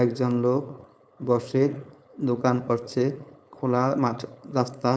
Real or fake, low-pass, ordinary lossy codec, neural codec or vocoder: fake; none; none; codec, 16 kHz, 4.8 kbps, FACodec